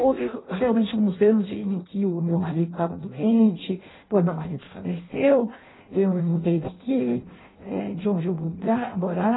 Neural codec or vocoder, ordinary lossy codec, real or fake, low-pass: codec, 16 kHz in and 24 kHz out, 0.6 kbps, FireRedTTS-2 codec; AAC, 16 kbps; fake; 7.2 kHz